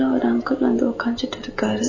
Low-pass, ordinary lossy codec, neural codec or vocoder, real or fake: 7.2 kHz; MP3, 32 kbps; none; real